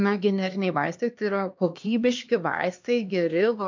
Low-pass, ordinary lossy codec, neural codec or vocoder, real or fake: 7.2 kHz; MP3, 64 kbps; codec, 16 kHz, 2 kbps, X-Codec, HuBERT features, trained on LibriSpeech; fake